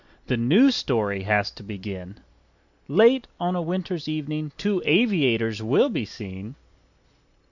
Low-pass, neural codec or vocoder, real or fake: 7.2 kHz; none; real